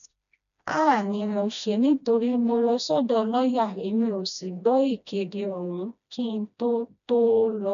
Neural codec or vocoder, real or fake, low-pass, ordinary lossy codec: codec, 16 kHz, 1 kbps, FreqCodec, smaller model; fake; 7.2 kHz; none